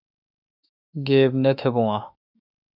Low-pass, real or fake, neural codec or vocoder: 5.4 kHz; fake; autoencoder, 48 kHz, 32 numbers a frame, DAC-VAE, trained on Japanese speech